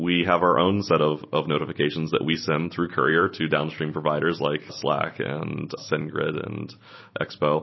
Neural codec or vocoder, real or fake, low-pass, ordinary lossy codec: none; real; 7.2 kHz; MP3, 24 kbps